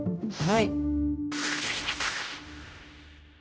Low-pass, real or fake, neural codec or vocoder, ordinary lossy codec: none; fake; codec, 16 kHz, 0.5 kbps, X-Codec, HuBERT features, trained on general audio; none